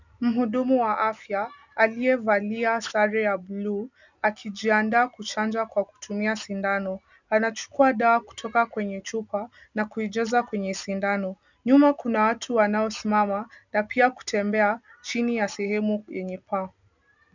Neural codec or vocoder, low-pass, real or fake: none; 7.2 kHz; real